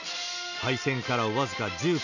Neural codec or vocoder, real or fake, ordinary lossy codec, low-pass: none; real; none; 7.2 kHz